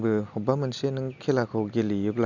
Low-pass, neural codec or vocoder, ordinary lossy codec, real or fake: 7.2 kHz; none; none; real